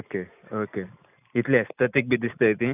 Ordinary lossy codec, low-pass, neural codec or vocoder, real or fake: none; 3.6 kHz; none; real